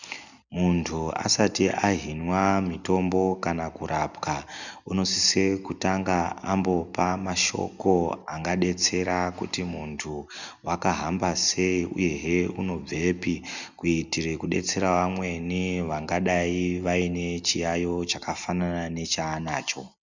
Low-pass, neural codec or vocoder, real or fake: 7.2 kHz; none; real